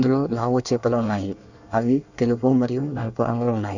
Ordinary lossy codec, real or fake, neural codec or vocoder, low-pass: none; fake; codec, 24 kHz, 1 kbps, SNAC; 7.2 kHz